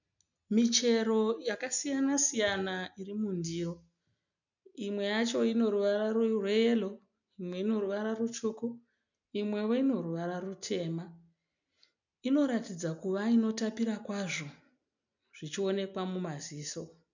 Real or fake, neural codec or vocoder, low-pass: real; none; 7.2 kHz